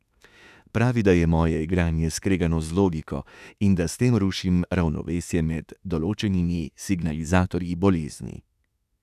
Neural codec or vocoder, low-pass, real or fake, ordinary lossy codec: autoencoder, 48 kHz, 32 numbers a frame, DAC-VAE, trained on Japanese speech; 14.4 kHz; fake; none